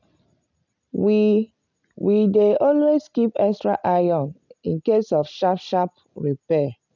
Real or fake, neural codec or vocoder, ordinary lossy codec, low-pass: real; none; none; 7.2 kHz